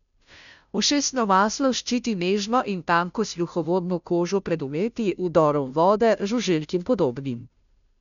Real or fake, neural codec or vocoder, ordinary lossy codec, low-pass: fake; codec, 16 kHz, 0.5 kbps, FunCodec, trained on Chinese and English, 25 frames a second; none; 7.2 kHz